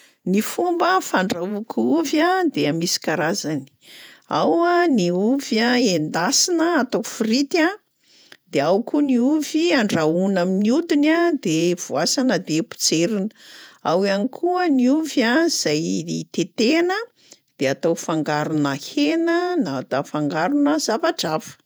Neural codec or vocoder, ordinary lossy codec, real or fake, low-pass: vocoder, 48 kHz, 128 mel bands, Vocos; none; fake; none